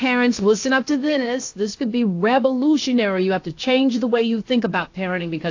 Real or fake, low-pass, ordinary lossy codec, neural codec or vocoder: fake; 7.2 kHz; AAC, 48 kbps; codec, 16 kHz, 0.7 kbps, FocalCodec